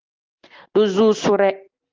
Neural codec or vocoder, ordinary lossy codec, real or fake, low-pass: none; Opus, 24 kbps; real; 7.2 kHz